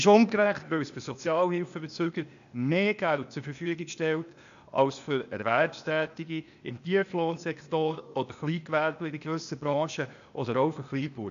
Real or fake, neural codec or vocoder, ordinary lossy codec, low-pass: fake; codec, 16 kHz, 0.8 kbps, ZipCodec; none; 7.2 kHz